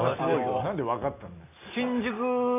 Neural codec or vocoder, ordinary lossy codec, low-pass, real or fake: none; AAC, 32 kbps; 3.6 kHz; real